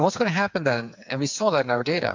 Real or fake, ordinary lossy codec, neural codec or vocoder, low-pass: fake; MP3, 64 kbps; codec, 16 kHz, 4 kbps, FreqCodec, smaller model; 7.2 kHz